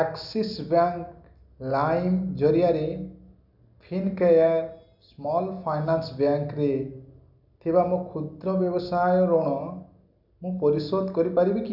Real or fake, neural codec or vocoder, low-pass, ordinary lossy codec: real; none; 5.4 kHz; none